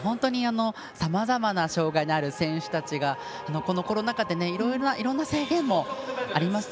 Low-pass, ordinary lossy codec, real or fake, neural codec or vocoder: none; none; real; none